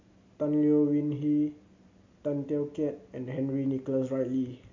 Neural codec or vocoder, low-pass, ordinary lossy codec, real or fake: none; 7.2 kHz; MP3, 48 kbps; real